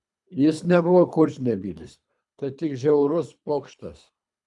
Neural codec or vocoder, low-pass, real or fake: codec, 24 kHz, 3 kbps, HILCodec; 10.8 kHz; fake